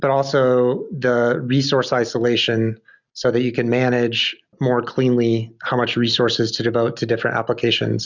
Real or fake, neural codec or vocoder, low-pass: real; none; 7.2 kHz